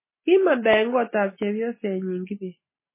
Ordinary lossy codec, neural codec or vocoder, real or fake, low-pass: MP3, 16 kbps; none; real; 3.6 kHz